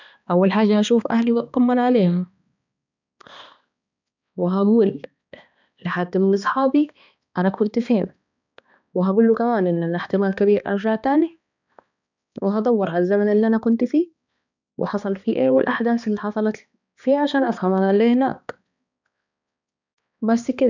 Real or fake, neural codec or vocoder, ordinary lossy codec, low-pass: fake; codec, 16 kHz, 2 kbps, X-Codec, HuBERT features, trained on balanced general audio; none; 7.2 kHz